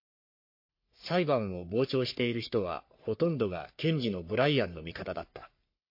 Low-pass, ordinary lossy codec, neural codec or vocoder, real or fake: 5.4 kHz; MP3, 32 kbps; codec, 44.1 kHz, 3.4 kbps, Pupu-Codec; fake